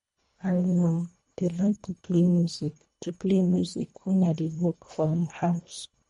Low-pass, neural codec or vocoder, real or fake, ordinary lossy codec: 10.8 kHz; codec, 24 kHz, 1.5 kbps, HILCodec; fake; MP3, 48 kbps